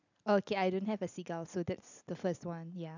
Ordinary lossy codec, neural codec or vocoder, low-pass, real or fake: none; none; 7.2 kHz; real